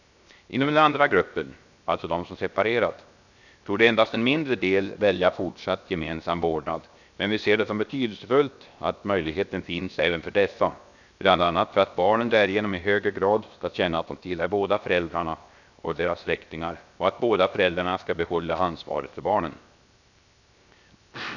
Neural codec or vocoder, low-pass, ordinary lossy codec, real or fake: codec, 16 kHz, 0.7 kbps, FocalCodec; 7.2 kHz; none; fake